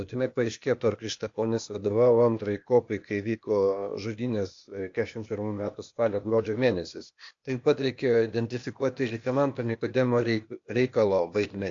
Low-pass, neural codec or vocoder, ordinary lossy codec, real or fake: 7.2 kHz; codec, 16 kHz, 0.8 kbps, ZipCodec; AAC, 48 kbps; fake